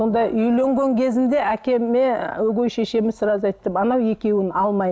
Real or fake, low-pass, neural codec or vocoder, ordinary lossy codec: real; none; none; none